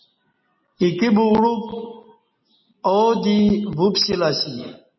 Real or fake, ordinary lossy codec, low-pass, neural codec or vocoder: real; MP3, 24 kbps; 7.2 kHz; none